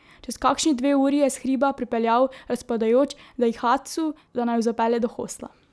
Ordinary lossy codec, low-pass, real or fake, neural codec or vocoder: none; none; real; none